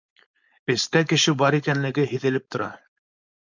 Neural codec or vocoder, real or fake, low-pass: codec, 16 kHz, 4.8 kbps, FACodec; fake; 7.2 kHz